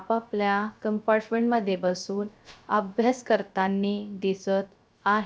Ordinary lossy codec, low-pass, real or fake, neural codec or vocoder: none; none; fake; codec, 16 kHz, 0.3 kbps, FocalCodec